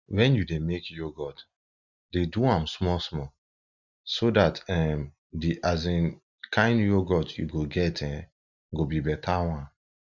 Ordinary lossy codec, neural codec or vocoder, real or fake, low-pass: none; none; real; 7.2 kHz